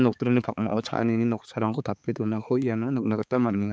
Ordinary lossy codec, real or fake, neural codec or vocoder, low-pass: none; fake; codec, 16 kHz, 4 kbps, X-Codec, HuBERT features, trained on balanced general audio; none